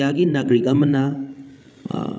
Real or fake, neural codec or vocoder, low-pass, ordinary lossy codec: fake; codec, 16 kHz, 16 kbps, FreqCodec, larger model; none; none